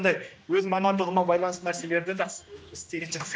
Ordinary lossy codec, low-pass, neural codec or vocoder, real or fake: none; none; codec, 16 kHz, 1 kbps, X-Codec, HuBERT features, trained on general audio; fake